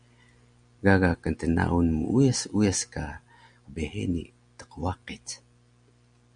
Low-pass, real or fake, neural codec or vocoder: 9.9 kHz; real; none